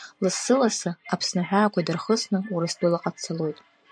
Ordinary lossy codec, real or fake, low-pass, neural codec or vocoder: MP3, 96 kbps; fake; 9.9 kHz; vocoder, 44.1 kHz, 128 mel bands every 512 samples, BigVGAN v2